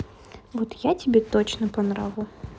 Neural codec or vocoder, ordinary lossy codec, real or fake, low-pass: none; none; real; none